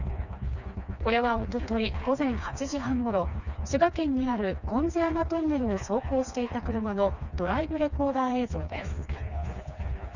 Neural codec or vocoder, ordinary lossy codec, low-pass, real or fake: codec, 16 kHz, 2 kbps, FreqCodec, smaller model; none; 7.2 kHz; fake